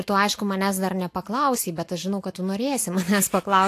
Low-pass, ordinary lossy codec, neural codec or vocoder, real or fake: 14.4 kHz; AAC, 64 kbps; none; real